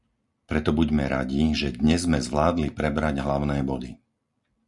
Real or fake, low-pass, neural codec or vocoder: real; 10.8 kHz; none